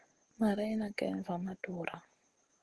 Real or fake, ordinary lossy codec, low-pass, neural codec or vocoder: real; Opus, 16 kbps; 10.8 kHz; none